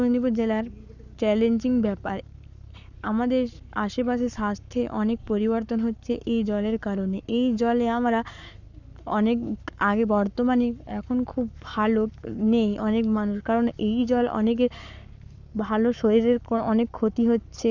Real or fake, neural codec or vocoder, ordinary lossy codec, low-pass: fake; codec, 44.1 kHz, 7.8 kbps, Pupu-Codec; none; 7.2 kHz